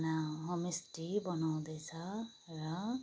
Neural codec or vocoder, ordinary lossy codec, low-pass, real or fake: none; none; none; real